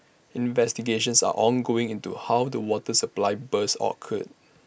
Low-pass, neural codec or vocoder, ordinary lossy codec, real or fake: none; none; none; real